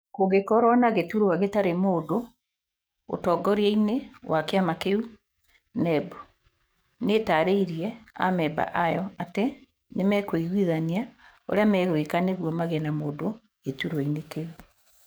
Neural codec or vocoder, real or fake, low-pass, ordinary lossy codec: codec, 44.1 kHz, 7.8 kbps, DAC; fake; none; none